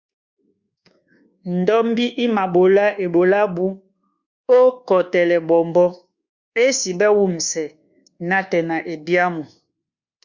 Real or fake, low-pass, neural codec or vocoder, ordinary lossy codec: fake; 7.2 kHz; codec, 24 kHz, 1.2 kbps, DualCodec; Opus, 64 kbps